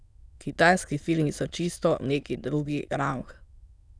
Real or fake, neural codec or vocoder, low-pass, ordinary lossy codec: fake; autoencoder, 22.05 kHz, a latent of 192 numbers a frame, VITS, trained on many speakers; none; none